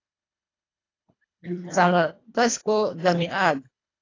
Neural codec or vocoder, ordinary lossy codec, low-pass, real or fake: codec, 24 kHz, 1.5 kbps, HILCodec; AAC, 32 kbps; 7.2 kHz; fake